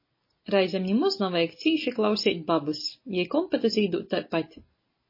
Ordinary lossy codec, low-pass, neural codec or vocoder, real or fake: MP3, 24 kbps; 5.4 kHz; none; real